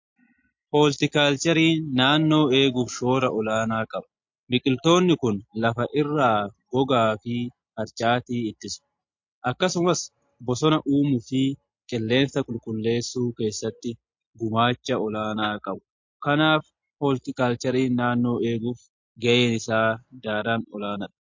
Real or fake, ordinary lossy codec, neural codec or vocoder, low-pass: real; MP3, 48 kbps; none; 7.2 kHz